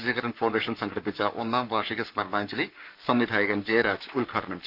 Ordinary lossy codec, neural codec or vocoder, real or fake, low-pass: none; codec, 44.1 kHz, 7.8 kbps, Pupu-Codec; fake; 5.4 kHz